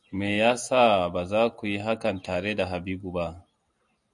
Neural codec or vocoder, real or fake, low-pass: none; real; 10.8 kHz